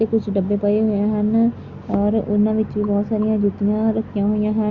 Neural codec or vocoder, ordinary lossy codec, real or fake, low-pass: none; Opus, 64 kbps; real; 7.2 kHz